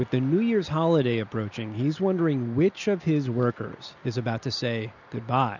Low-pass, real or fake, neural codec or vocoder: 7.2 kHz; real; none